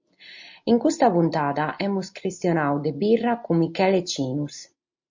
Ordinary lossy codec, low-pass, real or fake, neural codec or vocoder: MP3, 48 kbps; 7.2 kHz; real; none